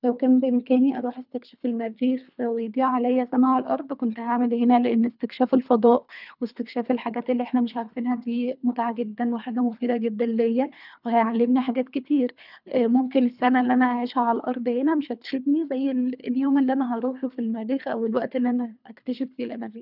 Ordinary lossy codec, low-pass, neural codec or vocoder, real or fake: none; 5.4 kHz; codec, 24 kHz, 3 kbps, HILCodec; fake